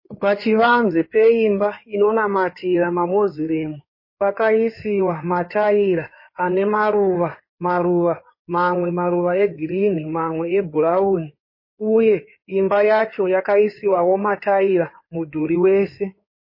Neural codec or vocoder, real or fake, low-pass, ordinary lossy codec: codec, 16 kHz in and 24 kHz out, 2.2 kbps, FireRedTTS-2 codec; fake; 5.4 kHz; MP3, 24 kbps